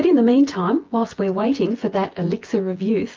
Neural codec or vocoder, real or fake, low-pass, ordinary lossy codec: vocoder, 24 kHz, 100 mel bands, Vocos; fake; 7.2 kHz; Opus, 32 kbps